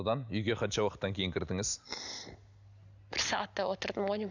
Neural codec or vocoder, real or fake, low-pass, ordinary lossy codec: none; real; 7.2 kHz; none